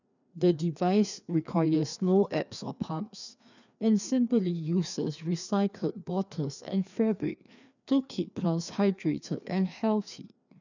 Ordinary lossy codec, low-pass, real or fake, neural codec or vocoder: none; 7.2 kHz; fake; codec, 16 kHz, 2 kbps, FreqCodec, larger model